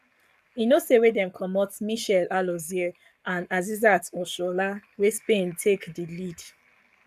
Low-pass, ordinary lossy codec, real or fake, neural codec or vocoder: 14.4 kHz; none; fake; codec, 44.1 kHz, 7.8 kbps, Pupu-Codec